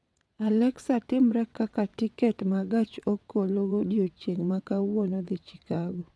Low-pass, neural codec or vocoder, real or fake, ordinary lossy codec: none; vocoder, 22.05 kHz, 80 mel bands, WaveNeXt; fake; none